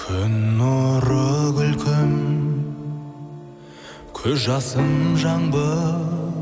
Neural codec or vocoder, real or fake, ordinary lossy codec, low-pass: none; real; none; none